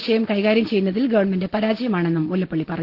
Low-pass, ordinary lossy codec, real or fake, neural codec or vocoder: 5.4 kHz; Opus, 16 kbps; real; none